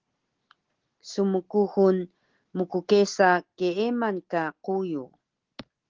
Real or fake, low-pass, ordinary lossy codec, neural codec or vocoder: real; 7.2 kHz; Opus, 16 kbps; none